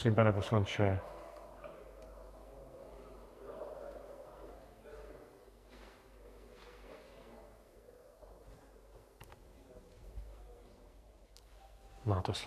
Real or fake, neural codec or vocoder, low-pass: fake; codec, 44.1 kHz, 2.6 kbps, SNAC; 14.4 kHz